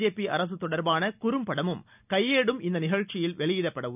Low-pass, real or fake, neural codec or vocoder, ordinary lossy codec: 3.6 kHz; real; none; none